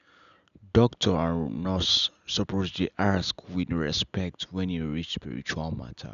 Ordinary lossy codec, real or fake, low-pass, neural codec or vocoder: none; real; 7.2 kHz; none